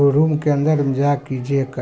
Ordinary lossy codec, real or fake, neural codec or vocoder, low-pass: none; real; none; none